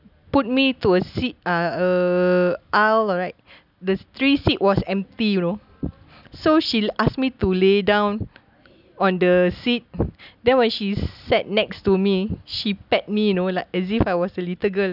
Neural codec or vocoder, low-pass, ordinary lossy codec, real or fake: none; 5.4 kHz; none; real